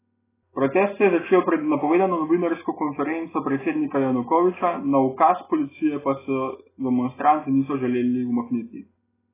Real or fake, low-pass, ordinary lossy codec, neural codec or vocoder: real; 3.6 kHz; AAC, 16 kbps; none